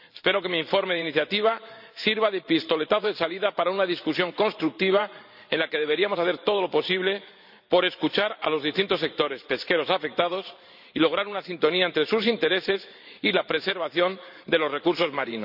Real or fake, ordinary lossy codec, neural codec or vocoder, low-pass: fake; none; vocoder, 44.1 kHz, 128 mel bands every 512 samples, BigVGAN v2; 5.4 kHz